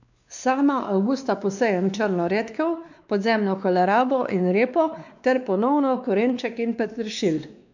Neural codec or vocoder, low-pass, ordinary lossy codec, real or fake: codec, 16 kHz, 2 kbps, X-Codec, WavLM features, trained on Multilingual LibriSpeech; 7.2 kHz; none; fake